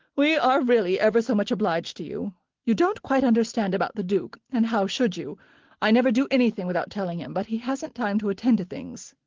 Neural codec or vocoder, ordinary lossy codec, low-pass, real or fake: none; Opus, 16 kbps; 7.2 kHz; real